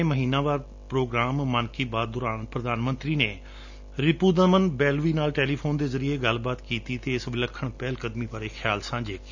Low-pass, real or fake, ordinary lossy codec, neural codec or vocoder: 7.2 kHz; real; none; none